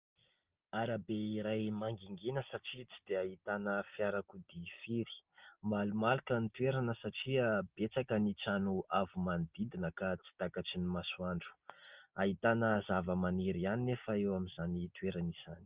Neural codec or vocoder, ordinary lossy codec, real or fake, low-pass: none; Opus, 32 kbps; real; 3.6 kHz